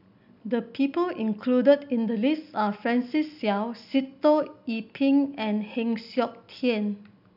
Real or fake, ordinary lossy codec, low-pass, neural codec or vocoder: real; none; 5.4 kHz; none